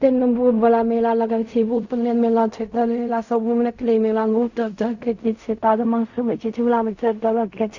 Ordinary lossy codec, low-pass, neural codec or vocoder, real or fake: none; 7.2 kHz; codec, 16 kHz in and 24 kHz out, 0.4 kbps, LongCat-Audio-Codec, fine tuned four codebook decoder; fake